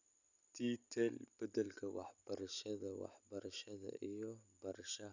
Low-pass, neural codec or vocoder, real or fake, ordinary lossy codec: 7.2 kHz; none; real; none